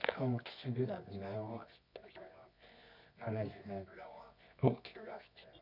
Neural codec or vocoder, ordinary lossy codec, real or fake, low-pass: codec, 24 kHz, 0.9 kbps, WavTokenizer, medium music audio release; none; fake; 5.4 kHz